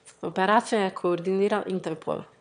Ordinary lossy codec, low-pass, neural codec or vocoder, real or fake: none; 9.9 kHz; autoencoder, 22.05 kHz, a latent of 192 numbers a frame, VITS, trained on one speaker; fake